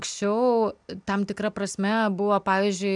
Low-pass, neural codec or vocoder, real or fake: 10.8 kHz; none; real